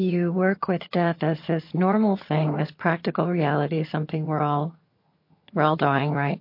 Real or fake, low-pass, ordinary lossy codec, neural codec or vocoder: fake; 5.4 kHz; MP3, 32 kbps; vocoder, 22.05 kHz, 80 mel bands, HiFi-GAN